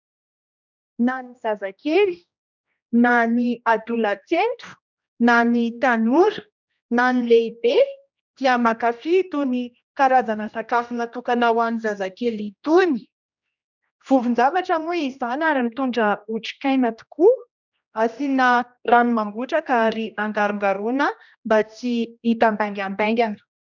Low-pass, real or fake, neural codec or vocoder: 7.2 kHz; fake; codec, 16 kHz, 1 kbps, X-Codec, HuBERT features, trained on general audio